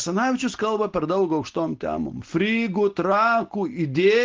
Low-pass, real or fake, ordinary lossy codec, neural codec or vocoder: 7.2 kHz; real; Opus, 16 kbps; none